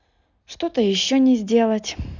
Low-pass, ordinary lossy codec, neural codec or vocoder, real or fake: 7.2 kHz; none; none; real